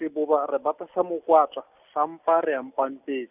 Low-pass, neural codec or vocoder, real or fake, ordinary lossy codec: 3.6 kHz; none; real; none